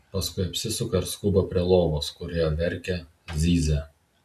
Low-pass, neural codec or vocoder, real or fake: 14.4 kHz; none; real